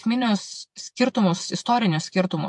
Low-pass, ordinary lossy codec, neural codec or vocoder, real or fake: 10.8 kHz; MP3, 64 kbps; vocoder, 48 kHz, 128 mel bands, Vocos; fake